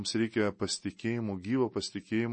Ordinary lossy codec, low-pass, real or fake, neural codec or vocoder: MP3, 32 kbps; 10.8 kHz; real; none